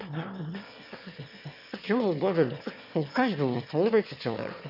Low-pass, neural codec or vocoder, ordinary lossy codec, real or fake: 5.4 kHz; autoencoder, 22.05 kHz, a latent of 192 numbers a frame, VITS, trained on one speaker; none; fake